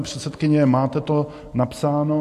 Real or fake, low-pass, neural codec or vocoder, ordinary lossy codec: real; 14.4 kHz; none; MP3, 64 kbps